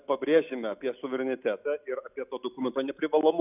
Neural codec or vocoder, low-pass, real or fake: none; 3.6 kHz; real